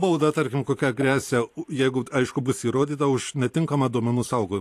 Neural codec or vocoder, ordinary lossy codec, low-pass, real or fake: vocoder, 44.1 kHz, 128 mel bands every 512 samples, BigVGAN v2; AAC, 64 kbps; 14.4 kHz; fake